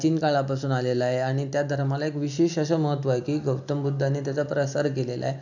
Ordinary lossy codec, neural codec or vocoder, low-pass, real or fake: none; none; 7.2 kHz; real